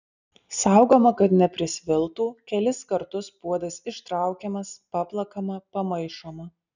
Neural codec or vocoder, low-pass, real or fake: none; 7.2 kHz; real